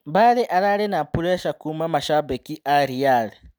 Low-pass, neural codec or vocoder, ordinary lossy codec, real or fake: none; none; none; real